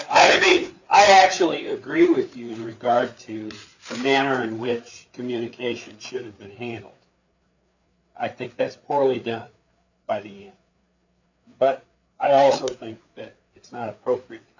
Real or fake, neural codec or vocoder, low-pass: fake; codec, 16 kHz, 4 kbps, FreqCodec, larger model; 7.2 kHz